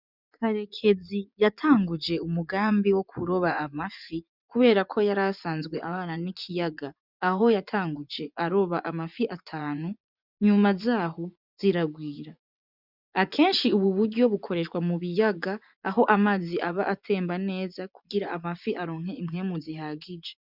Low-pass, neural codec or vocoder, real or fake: 5.4 kHz; vocoder, 24 kHz, 100 mel bands, Vocos; fake